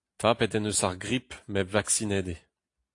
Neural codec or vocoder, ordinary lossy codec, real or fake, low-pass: none; AAC, 48 kbps; real; 10.8 kHz